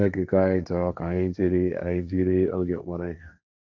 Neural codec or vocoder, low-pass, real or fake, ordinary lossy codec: codec, 16 kHz, 1.1 kbps, Voila-Tokenizer; none; fake; none